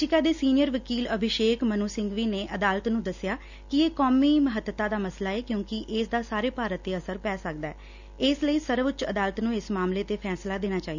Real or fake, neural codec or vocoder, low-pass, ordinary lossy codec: real; none; 7.2 kHz; none